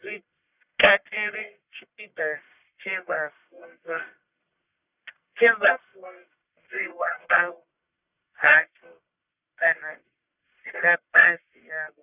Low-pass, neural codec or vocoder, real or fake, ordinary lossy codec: 3.6 kHz; codec, 44.1 kHz, 1.7 kbps, Pupu-Codec; fake; none